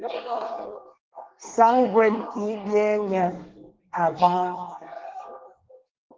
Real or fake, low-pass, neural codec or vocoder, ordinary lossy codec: fake; 7.2 kHz; codec, 24 kHz, 1 kbps, SNAC; Opus, 16 kbps